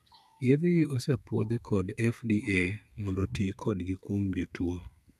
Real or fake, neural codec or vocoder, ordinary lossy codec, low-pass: fake; codec, 32 kHz, 1.9 kbps, SNAC; none; 14.4 kHz